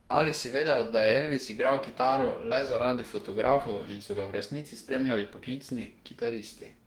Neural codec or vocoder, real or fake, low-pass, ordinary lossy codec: codec, 44.1 kHz, 2.6 kbps, DAC; fake; 19.8 kHz; Opus, 32 kbps